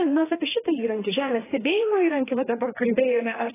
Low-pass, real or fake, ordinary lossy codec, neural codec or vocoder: 3.6 kHz; fake; AAC, 16 kbps; codec, 16 kHz, 2 kbps, FreqCodec, larger model